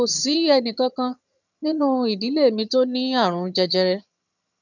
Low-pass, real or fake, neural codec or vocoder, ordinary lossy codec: 7.2 kHz; fake; vocoder, 22.05 kHz, 80 mel bands, HiFi-GAN; none